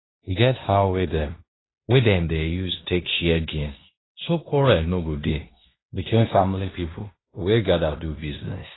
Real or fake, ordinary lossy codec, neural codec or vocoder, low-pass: fake; AAC, 16 kbps; codec, 16 kHz in and 24 kHz out, 0.9 kbps, LongCat-Audio-Codec, fine tuned four codebook decoder; 7.2 kHz